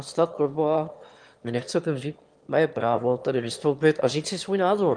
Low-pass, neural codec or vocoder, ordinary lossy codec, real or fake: 9.9 kHz; autoencoder, 22.05 kHz, a latent of 192 numbers a frame, VITS, trained on one speaker; Opus, 32 kbps; fake